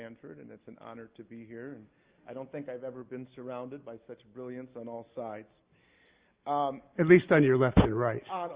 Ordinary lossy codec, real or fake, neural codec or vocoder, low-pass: Opus, 32 kbps; real; none; 3.6 kHz